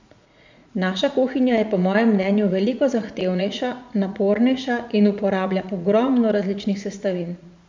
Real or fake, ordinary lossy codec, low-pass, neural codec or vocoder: fake; MP3, 64 kbps; 7.2 kHz; vocoder, 44.1 kHz, 80 mel bands, Vocos